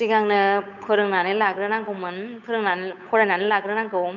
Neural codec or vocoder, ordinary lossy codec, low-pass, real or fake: codec, 16 kHz, 8 kbps, FunCodec, trained on Chinese and English, 25 frames a second; none; 7.2 kHz; fake